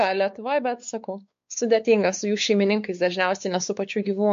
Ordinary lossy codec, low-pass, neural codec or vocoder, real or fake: MP3, 48 kbps; 7.2 kHz; codec, 16 kHz, 4 kbps, X-Codec, WavLM features, trained on Multilingual LibriSpeech; fake